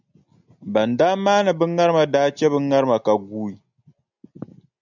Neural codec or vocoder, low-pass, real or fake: none; 7.2 kHz; real